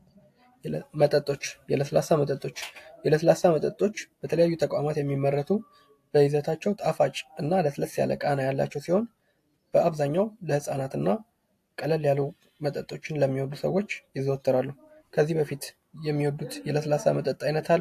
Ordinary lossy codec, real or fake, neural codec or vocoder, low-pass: AAC, 64 kbps; real; none; 14.4 kHz